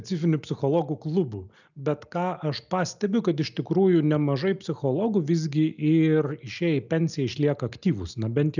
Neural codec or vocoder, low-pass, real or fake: none; 7.2 kHz; real